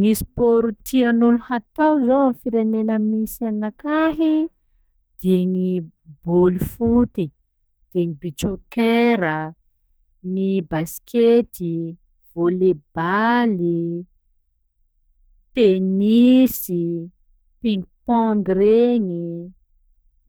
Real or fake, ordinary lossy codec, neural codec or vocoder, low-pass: fake; none; codec, 44.1 kHz, 2.6 kbps, SNAC; none